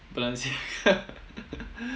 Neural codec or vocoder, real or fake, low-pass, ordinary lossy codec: none; real; none; none